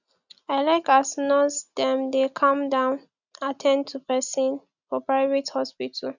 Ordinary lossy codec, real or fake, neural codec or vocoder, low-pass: none; real; none; 7.2 kHz